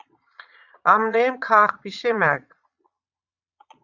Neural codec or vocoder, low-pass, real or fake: vocoder, 22.05 kHz, 80 mel bands, Vocos; 7.2 kHz; fake